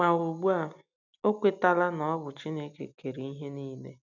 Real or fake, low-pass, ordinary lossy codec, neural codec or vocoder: real; 7.2 kHz; none; none